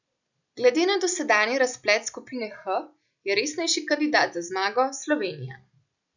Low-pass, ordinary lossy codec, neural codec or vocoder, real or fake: 7.2 kHz; none; none; real